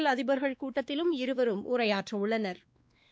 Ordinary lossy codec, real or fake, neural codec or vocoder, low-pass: none; fake; codec, 16 kHz, 2 kbps, X-Codec, WavLM features, trained on Multilingual LibriSpeech; none